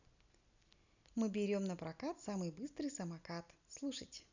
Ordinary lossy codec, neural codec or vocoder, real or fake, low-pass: none; none; real; 7.2 kHz